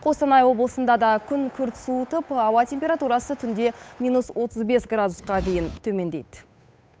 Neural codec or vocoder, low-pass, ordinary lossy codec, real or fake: codec, 16 kHz, 8 kbps, FunCodec, trained on Chinese and English, 25 frames a second; none; none; fake